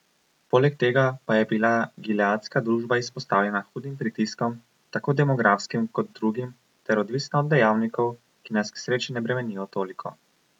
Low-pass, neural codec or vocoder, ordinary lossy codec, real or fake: 19.8 kHz; none; none; real